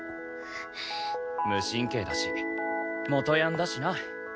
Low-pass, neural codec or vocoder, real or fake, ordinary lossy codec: none; none; real; none